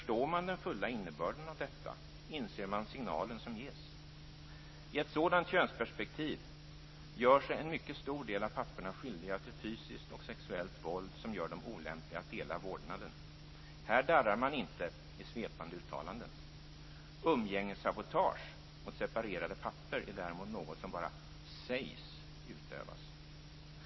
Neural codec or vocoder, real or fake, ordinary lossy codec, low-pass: none; real; MP3, 24 kbps; 7.2 kHz